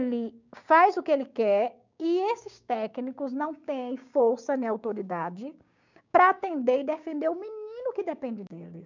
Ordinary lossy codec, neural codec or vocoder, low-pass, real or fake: none; codec, 16 kHz, 6 kbps, DAC; 7.2 kHz; fake